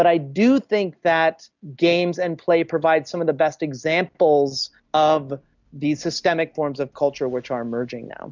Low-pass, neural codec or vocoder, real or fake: 7.2 kHz; none; real